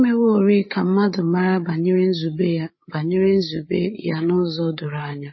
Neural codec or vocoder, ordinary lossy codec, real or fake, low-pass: codec, 16 kHz, 8 kbps, FreqCodec, larger model; MP3, 24 kbps; fake; 7.2 kHz